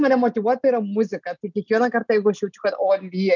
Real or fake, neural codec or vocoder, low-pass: real; none; 7.2 kHz